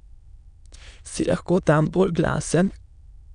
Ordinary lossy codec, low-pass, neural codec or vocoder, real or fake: none; 9.9 kHz; autoencoder, 22.05 kHz, a latent of 192 numbers a frame, VITS, trained on many speakers; fake